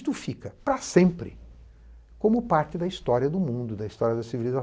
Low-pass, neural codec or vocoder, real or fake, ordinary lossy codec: none; none; real; none